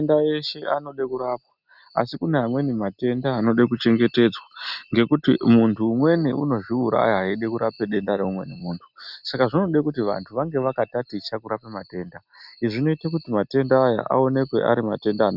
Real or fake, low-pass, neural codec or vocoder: real; 5.4 kHz; none